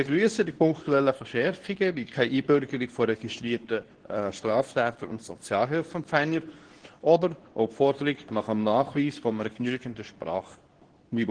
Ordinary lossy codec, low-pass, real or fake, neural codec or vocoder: Opus, 16 kbps; 9.9 kHz; fake; codec, 24 kHz, 0.9 kbps, WavTokenizer, medium speech release version 2